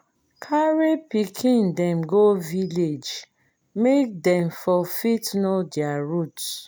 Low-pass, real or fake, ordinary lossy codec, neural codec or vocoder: none; real; none; none